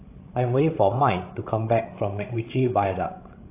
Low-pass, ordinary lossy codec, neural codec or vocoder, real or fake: 3.6 kHz; AAC, 24 kbps; codec, 16 kHz, 16 kbps, FreqCodec, larger model; fake